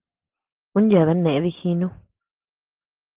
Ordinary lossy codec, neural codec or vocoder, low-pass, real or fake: Opus, 16 kbps; none; 3.6 kHz; real